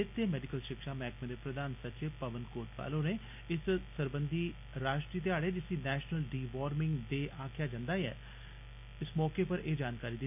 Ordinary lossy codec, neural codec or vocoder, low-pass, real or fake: none; none; 3.6 kHz; real